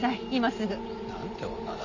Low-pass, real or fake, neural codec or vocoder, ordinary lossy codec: 7.2 kHz; real; none; none